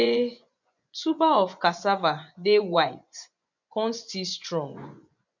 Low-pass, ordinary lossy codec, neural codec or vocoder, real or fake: 7.2 kHz; none; vocoder, 24 kHz, 100 mel bands, Vocos; fake